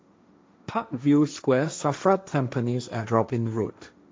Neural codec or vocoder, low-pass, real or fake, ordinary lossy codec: codec, 16 kHz, 1.1 kbps, Voila-Tokenizer; none; fake; none